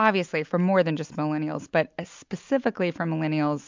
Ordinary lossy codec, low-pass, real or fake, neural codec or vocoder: MP3, 64 kbps; 7.2 kHz; real; none